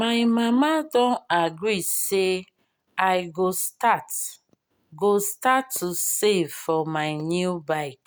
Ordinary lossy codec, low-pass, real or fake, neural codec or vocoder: none; none; real; none